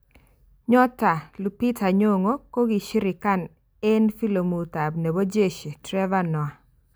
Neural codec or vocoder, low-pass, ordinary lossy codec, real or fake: none; none; none; real